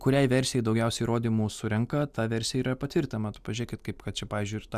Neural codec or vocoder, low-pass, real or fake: none; 14.4 kHz; real